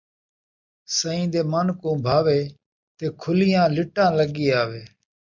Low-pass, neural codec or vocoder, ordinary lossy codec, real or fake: 7.2 kHz; none; MP3, 64 kbps; real